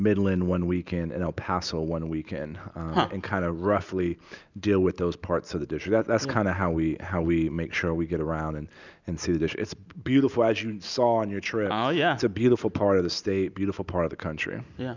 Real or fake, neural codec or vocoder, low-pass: real; none; 7.2 kHz